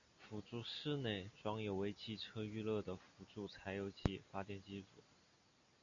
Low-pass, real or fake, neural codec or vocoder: 7.2 kHz; real; none